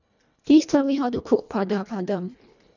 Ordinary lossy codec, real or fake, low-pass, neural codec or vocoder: none; fake; 7.2 kHz; codec, 24 kHz, 1.5 kbps, HILCodec